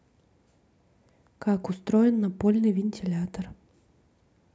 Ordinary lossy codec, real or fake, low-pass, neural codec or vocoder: none; real; none; none